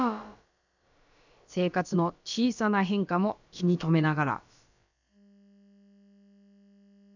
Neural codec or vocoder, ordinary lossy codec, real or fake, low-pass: codec, 16 kHz, about 1 kbps, DyCAST, with the encoder's durations; none; fake; 7.2 kHz